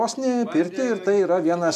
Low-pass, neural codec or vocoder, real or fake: 14.4 kHz; none; real